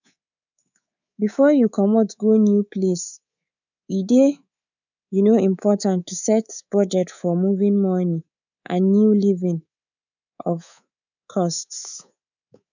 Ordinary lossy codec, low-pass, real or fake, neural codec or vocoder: none; 7.2 kHz; fake; codec, 24 kHz, 3.1 kbps, DualCodec